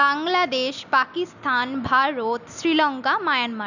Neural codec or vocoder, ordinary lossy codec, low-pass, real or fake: none; none; 7.2 kHz; real